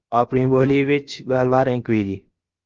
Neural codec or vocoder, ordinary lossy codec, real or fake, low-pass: codec, 16 kHz, about 1 kbps, DyCAST, with the encoder's durations; Opus, 32 kbps; fake; 7.2 kHz